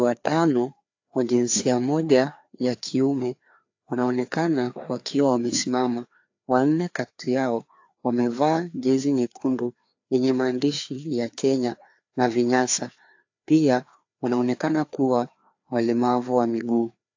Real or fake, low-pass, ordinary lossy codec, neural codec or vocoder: fake; 7.2 kHz; AAC, 48 kbps; codec, 16 kHz, 2 kbps, FreqCodec, larger model